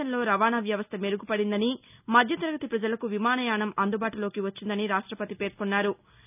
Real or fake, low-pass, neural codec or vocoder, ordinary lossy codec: real; 3.6 kHz; none; none